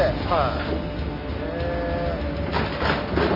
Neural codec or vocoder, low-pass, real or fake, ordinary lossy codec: none; 5.4 kHz; real; none